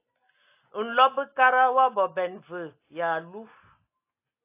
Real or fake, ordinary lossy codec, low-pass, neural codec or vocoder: real; AAC, 24 kbps; 3.6 kHz; none